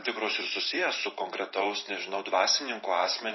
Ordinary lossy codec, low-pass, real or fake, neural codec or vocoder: MP3, 24 kbps; 7.2 kHz; fake; vocoder, 44.1 kHz, 128 mel bands every 512 samples, BigVGAN v2